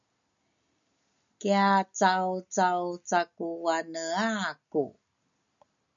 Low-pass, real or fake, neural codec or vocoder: 7.2 kHz; real; none